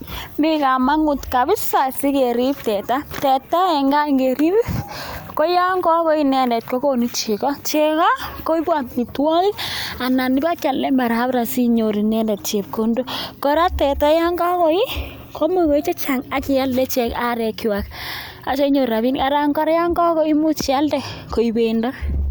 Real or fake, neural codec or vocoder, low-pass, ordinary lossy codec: real; none; none; none